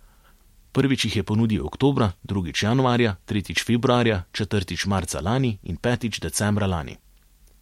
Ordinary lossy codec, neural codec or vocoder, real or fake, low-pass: MP3, 64 kbps; none; real; 19.8 kHz